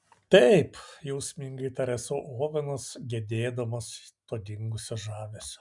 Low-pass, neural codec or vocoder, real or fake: 10.8 kHz; none; real